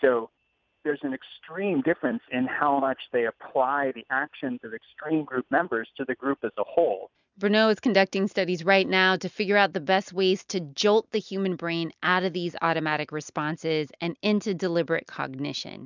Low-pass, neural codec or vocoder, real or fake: 7.2 kHz; none; real